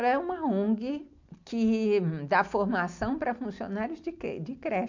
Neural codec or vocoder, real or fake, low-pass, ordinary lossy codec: none; real; 7.2 kHz; MP3, 64 kbps